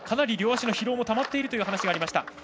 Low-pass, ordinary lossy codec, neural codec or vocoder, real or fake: none; none; none; real